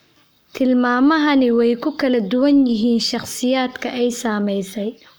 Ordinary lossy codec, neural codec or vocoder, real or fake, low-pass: none; codec, 44.1 kHz, 7.8 kbps, Pupu-Codec; fake; none